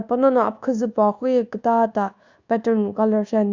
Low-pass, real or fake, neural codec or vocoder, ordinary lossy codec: 7.2 kHz; fake; codec, 24 kHz, 1.2 kbps, DualCodec; Opus, 64 kbps